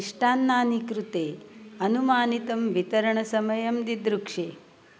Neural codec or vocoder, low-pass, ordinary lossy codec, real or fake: none; none; none; real